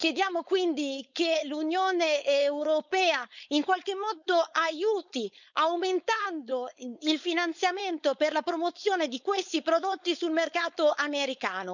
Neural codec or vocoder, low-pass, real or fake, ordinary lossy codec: codec, 16 kHz, 4.8 kbps, FACodec; 7.2 kHz; fake; none